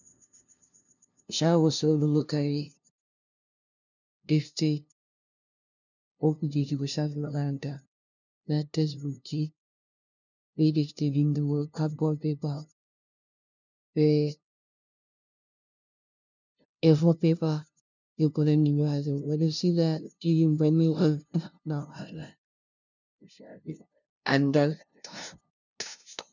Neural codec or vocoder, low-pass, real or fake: codec, 16 kHz, 0.5 kbps, FunCodec, trained on LibriTTS, 25 frames a second; 7.2 kHz; fake